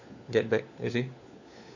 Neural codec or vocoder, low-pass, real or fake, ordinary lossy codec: codec, 16 kHz, 4 kbps, FunCodec, trained on LibriTTS, 50 frames a second; 7.2 kHz; fake; none